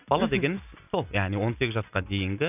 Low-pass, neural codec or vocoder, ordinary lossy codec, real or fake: 3.6 kHz; none; none; real